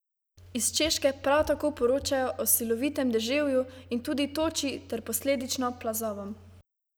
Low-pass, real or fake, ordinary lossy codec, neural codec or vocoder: none; real; none; none